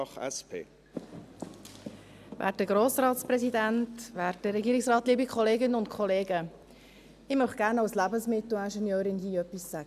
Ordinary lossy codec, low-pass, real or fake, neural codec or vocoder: none; 14.4 kHz; real; none